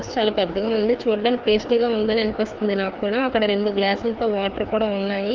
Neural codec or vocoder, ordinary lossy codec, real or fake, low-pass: codec, 16 kHz, 2 kbps, FreqCodec, larger model; Opus, 24 kbps; fake; 7.2 kHz